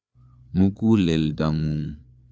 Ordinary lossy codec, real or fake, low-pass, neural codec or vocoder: none; fake; none; codec, 16 kHz, 8 kbps, FreqCodec, larger model